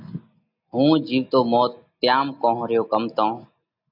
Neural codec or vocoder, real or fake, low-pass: none; real; 5.4 kHz